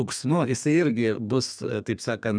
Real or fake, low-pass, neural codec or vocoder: fake; 9.9 kHz; codec, 32 kHz, 1.9 kbps, SNAC